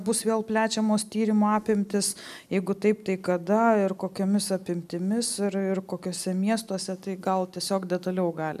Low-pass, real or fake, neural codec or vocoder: 14.4 kHz; real; none